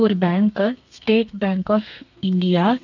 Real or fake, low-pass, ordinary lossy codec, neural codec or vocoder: fake; 7.2 kHz; none; codec, 32 kHz, 1.9 kbps, SNAC